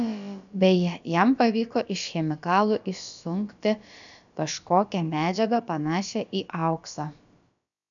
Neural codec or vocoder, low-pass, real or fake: codec, 16 kHz, about 1 kbps, DyCAST, with the encoder's durations; 7.2 kHz; fake